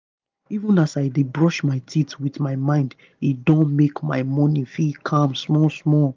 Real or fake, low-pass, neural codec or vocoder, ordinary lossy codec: real; 7.2 kHz; none; Opus, 24 kbps